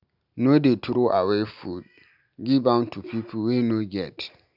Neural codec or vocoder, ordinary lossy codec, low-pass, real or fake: none; none; 5.4 kHz; real